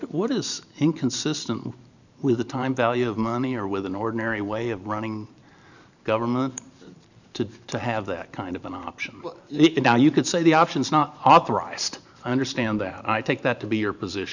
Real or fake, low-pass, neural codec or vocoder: fake; 7.2 kHz; vocoder, 44.1 kHz, 128 mel bands every 512 samples, BigVGAN v2